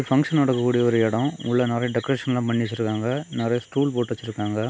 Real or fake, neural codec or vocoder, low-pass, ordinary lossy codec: real; none; none; none